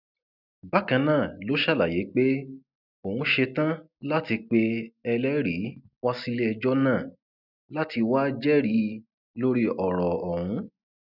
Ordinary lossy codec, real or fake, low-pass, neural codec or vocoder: none; real; 5.4 kHz; none